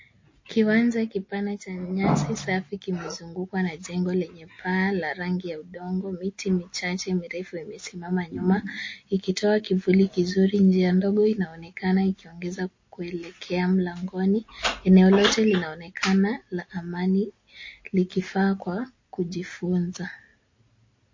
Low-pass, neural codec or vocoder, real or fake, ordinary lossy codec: 7.2 kHz; none; real; MP3, 32 kbps